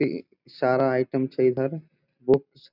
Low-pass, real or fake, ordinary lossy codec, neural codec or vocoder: 5.4 kHz; real; none; none